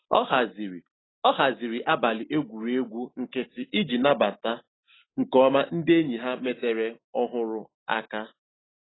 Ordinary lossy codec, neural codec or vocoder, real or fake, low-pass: AAC, 16 kbps; none; real; 7.2 kHz